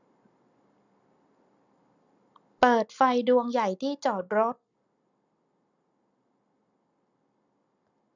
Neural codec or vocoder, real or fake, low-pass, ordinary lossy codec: none; real; 7.2 kHz; none